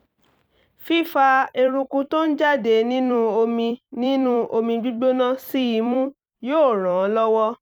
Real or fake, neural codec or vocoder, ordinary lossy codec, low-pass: fake; vocoder, 44.1 kHz, 128 mel bands every 256 samples, BigVGAN v2; none; 19.8 kHz